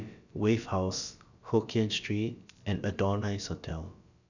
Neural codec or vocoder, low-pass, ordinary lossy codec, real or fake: codec, 16 kHz, about 1 kbps, DyCAST, with the encoder's durations; 7.2 kHz; none; fake